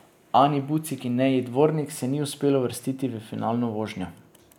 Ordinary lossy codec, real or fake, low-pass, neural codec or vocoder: none; real; 19.8 kHz; none